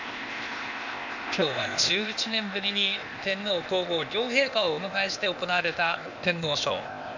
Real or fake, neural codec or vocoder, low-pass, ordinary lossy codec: fake; codec, 16 kHz, 0.8 kbps, ZipCodec; 7.2 kHz; none